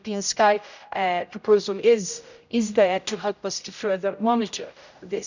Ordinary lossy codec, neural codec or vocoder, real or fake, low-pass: none; codec, 16 kHz, 0.5 kbps, X-Codec, HuBERT features, trained on general audio; fake; 7.2 kHz